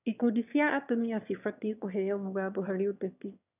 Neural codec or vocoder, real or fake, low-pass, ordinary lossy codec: autoencoder, 22.05 kHz, a latent of 192 numbers a frame, VITS, trained on one speaker; fake; 3.6 kHz; none